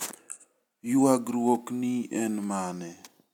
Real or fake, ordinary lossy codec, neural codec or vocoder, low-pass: real; none; none; 19.8 kHz